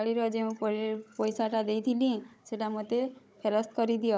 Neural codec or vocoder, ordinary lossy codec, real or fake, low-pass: codec, 16 kHz, 16 kbps, FunCodec, trained on Chinese and English, 50 frames a second; none; fake; none